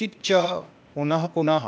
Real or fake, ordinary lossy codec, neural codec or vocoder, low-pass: fake; none; codec, 16 kHz, 0.8 kbps, ZipCodec; none